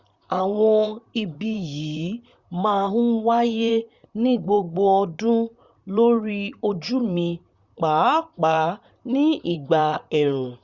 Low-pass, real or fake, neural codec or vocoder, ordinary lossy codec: 7.2 kHz; fake; vocoder, 44.1 kHz, 128 mel bands, Pupu-Vocoder; Opus, 64 kbps